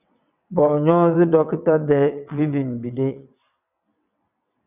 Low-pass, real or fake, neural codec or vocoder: 3.6 kHz; fake; vocoder, 22.05 kHz, 80 mel bands, WaveNeXt